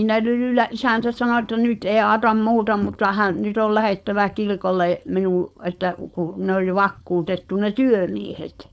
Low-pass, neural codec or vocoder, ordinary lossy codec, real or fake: none; codec, 16 kHz, 4.8 kbps, FACodec; none; fake